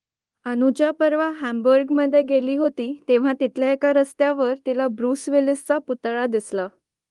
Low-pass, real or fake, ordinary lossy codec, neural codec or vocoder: 10.8 kHz; fake; Opus, 24 kbps; codec, 24 kHz, 0.9 kbps, DualCodec